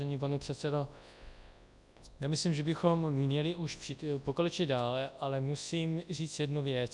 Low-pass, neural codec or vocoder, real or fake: 10.8 kHz; codec, 24 kHz, 0.9 kbps, WavTokenizer, large speech release; fake